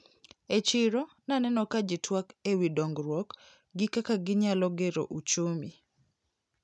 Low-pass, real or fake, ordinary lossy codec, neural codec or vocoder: none; real; none; none